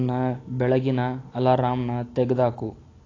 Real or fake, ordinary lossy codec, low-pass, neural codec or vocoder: real; AAC, 32 kbps; 7.2 kHz; none